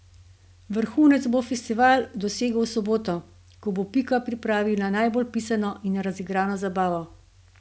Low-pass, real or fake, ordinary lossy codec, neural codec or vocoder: none; real; none; none